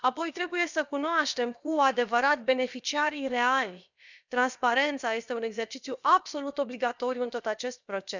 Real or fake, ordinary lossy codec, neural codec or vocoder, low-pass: fake; none; codec, 16 kHz, about 1 kbps, DyCAST, with the encoder's durations; 7.2 kHz